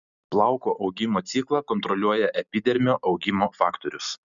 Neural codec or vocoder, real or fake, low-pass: none; real; 7.2 kHz